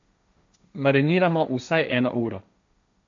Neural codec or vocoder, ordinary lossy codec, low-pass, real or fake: codec, 16 kHz, 1.1 kbps, Voila-Tokenizer; AAC, 64 kbps; 7.2 kHz; fake